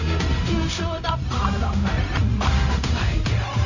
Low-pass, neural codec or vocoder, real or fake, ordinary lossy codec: 7.2 kHz; codec, 16 kHz, 0.4 kbps, LongCat-Audio-Codec; fake; none